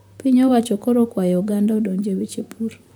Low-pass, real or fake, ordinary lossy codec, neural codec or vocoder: none; fake; none; vocoder, 44.1 kHz, 128 mel bands, Pupu-Vocoder